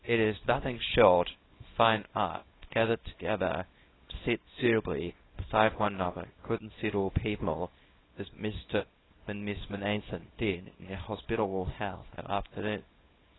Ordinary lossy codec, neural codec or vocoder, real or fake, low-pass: AAC, 16 kbps; codec, 24 kHz, 0.9 kbps, WavTokenizer, small release; fake; 7.2 kHz